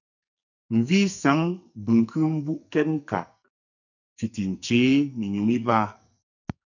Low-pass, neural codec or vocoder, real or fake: 7.2 kHz; codec, 44.1 kHz, 2.6 kbps, SNAC; fake